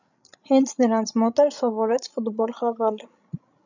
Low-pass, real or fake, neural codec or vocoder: 7.2 kHz; fake; codec, 16 kHz, 16 kbps, FreqCodec, larger model